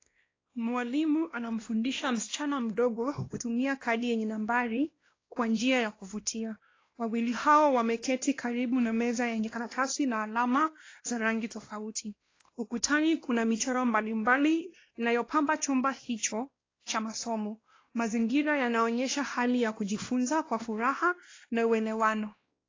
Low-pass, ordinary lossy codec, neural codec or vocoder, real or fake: 7.2 kHz; AAC, 32 kbps; codec, 16 kHz, 1 kbps, X-Codec, WavLM features, trained on Multilingual LibriSpeech; fake